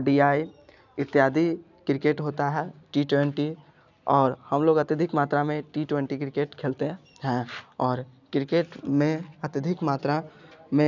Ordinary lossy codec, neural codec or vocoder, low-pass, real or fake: none; none; 7.2 kHz; real